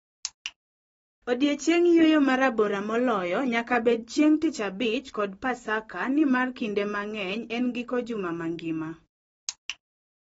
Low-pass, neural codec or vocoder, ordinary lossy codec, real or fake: 19.8 kHz; none; AAC, 24 kbps; real